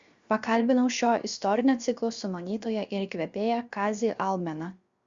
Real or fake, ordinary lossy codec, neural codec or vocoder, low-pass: fake; Opus, 64 kbps; codec, 16 kHz, 0.7 kbps, FocalCodec; 7.2 kHz